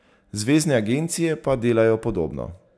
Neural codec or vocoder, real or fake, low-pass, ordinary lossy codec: none; real; none; none